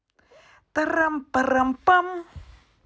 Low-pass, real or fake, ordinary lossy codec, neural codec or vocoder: none; real; none; none